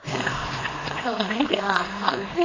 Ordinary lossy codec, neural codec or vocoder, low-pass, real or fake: AAC, 32 kbps; codec, 24 kHz, 0.9 kbps, WavTokenizer, small release; 7.2 kHz; fake